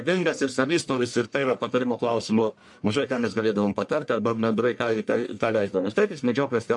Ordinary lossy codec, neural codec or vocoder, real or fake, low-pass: MP3, 64 kbps; codec, 44.1 kHz, 1.7 kbps, Pupu-Codec; fake; 10.8 kHz